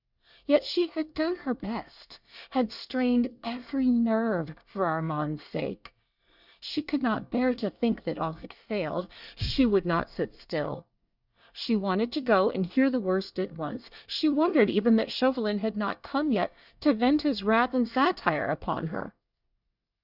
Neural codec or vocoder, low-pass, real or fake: codec, 24 kHz, 1 kbps, SNAC; 5.4 kHz; fake